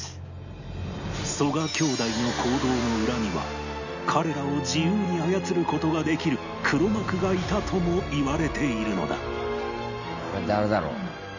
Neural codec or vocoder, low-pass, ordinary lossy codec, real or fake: none; 7.2 kHz; none; real